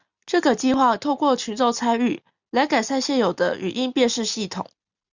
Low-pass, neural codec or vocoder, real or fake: 7.2 kHz; none; real